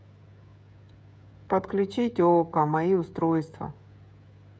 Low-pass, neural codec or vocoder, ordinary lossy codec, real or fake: none; codec, 16 kHz, 16 kbps, FreqCodec, smaller model; none; fake